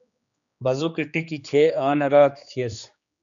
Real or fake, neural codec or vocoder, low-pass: fake; codec, 16 kHz, 2 kbps, X-Codec, HuBERT features, trained on general audio; 7.2 kHz